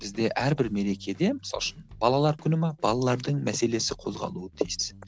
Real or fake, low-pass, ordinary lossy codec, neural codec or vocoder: real; none; none; none